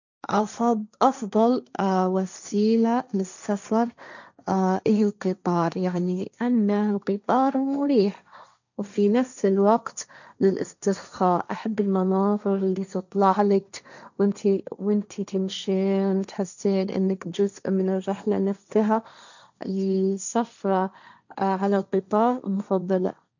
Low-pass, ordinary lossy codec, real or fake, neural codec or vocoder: 7.2 kHz; none; fake; codec, 16 kHz, 1.1 kbps, Voila-Tokenizer